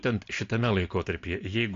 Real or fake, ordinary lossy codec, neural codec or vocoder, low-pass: real; AAC, 48 kbps; none; 7.2 kHz